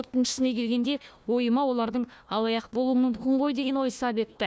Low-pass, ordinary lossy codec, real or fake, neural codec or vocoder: none; none; fake; codec, 16 kHz, 1 kbps, FunCodec, trained on Chinese and English, 50 frames a second